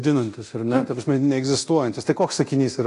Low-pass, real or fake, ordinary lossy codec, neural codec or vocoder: 10.8 kHz; fake; AAC, 48 kbps; codec, 24 kHz, 0.9 kbps, DualCodec